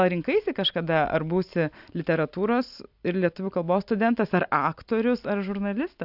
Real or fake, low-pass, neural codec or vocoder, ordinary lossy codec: real; 5.4 kHz; none; AAC, 48 kbps